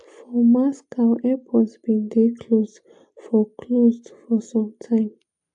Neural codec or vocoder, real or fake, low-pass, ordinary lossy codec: none; real; 9.9 kHz; AAC, 64 kbps